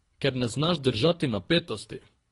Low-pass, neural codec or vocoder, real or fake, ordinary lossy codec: 10.8 kHz; codec, 24 kHz, 3 kbps, HILCodec; fake; AAC, 32 kbps